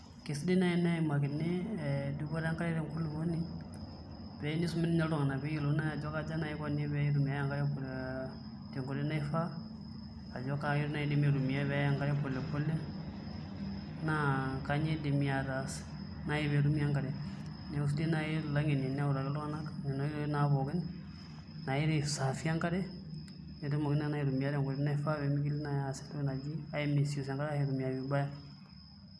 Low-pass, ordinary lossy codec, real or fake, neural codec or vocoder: none; none; real; none